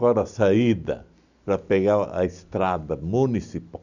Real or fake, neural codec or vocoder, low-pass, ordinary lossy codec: real; none; 7.2 kHz; none